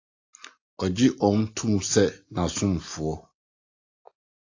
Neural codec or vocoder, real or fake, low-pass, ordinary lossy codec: none; real; 7.2 kHz; AAC, 48 kbps